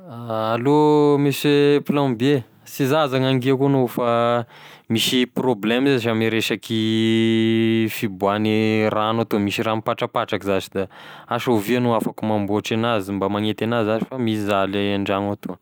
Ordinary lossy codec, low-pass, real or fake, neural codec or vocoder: none; none; real; none